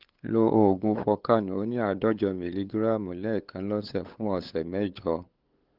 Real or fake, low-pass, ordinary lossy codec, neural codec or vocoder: fake; 5.4 kHz; Opus, 16 kbps; codec, 16 kHz, 8 kbps, FunCodec, trained on LibriTTS, 25 frames a second